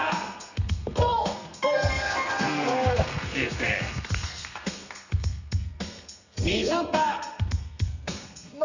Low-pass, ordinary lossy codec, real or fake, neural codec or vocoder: 7.2 kHz; none; fake; codec, 32 kHz, 1.9 kbps, SNAC